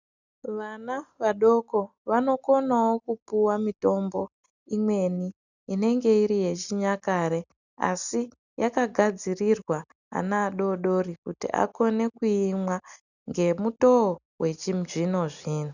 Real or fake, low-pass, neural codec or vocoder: real; 7.2 kHz; none